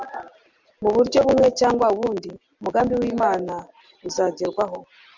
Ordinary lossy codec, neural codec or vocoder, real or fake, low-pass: MP3, 64 kbps; none; real; 7.2 kHz